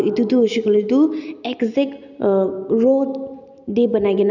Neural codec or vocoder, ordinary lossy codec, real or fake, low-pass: none; none; real; 7.2 kHz